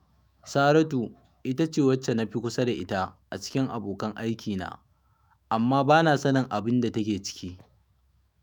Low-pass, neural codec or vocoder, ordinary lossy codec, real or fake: none; autoencoder, 48 kHz, 128 numbers a frame, DAC-VAE, trained on Japanese speech; none; fake